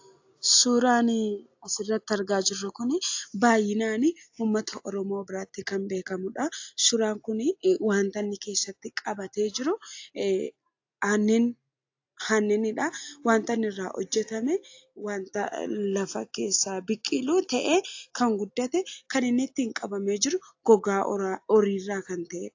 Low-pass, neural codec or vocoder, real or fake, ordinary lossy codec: 7.2 kHz; none; real; AAC, 48 kbps